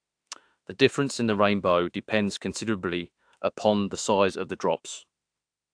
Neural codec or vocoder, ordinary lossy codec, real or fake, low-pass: autoencoder, 48 kHz, 32 numbers a frame, DAC-VAE, trained on Japanese speech; AAC, 64 kbps; fake; 9.9 kHz